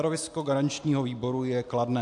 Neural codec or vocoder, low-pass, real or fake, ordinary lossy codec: none; 10.8 kHz; real; MP3, 64 kbps